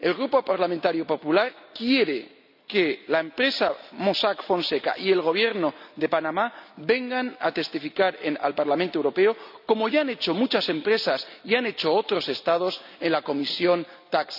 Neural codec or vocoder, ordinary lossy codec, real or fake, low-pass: none; none; real; 5.4 kHz